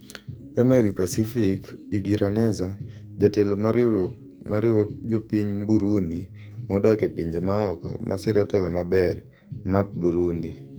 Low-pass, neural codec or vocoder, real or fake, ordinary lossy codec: none; codec, 44.1 kHz, 2.6 kbps, SNAC; fake; none